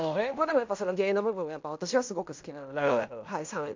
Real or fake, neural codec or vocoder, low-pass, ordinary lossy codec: fake; codec, 16 kHz in and 24 kHz out, 0.9 kbps, LongCat-Audio-Codec, fine tuned four codebook decoder; 7.2 kHz; MP3, 64 kbps